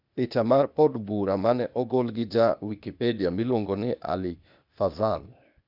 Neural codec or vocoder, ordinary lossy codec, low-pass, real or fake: codec, 16 kHz, 0.8 kbps, ZipCodec; none; 5.4 kHz; fake